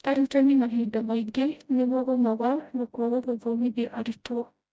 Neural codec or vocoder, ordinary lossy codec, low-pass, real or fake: codec, 16 kHz, 0.5 kbps, FreqCodec, smaller model; none; none; fake